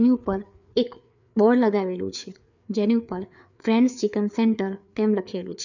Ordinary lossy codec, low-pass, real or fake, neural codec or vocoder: none; 7.2 kHz; fake; codec, 16 kHz, 4 kbps, FreqCodec, larger model